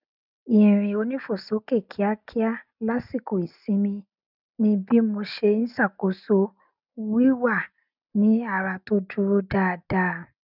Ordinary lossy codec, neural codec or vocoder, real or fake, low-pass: none; none; real; 5.4 kHz